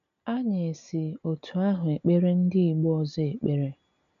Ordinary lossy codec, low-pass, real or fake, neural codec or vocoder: none; 7.2 kHz; real; none